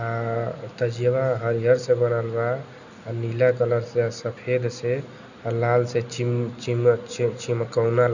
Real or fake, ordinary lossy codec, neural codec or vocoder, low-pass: real; none; none; 7.2 kHz